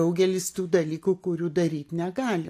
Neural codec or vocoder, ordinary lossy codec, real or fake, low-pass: none; AAC, 64 kbps; real; 14.4 kHz